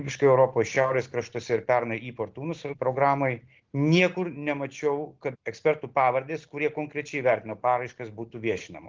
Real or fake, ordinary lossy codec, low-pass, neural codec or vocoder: real; Opus, 16 kbps; 7.2 kHz; none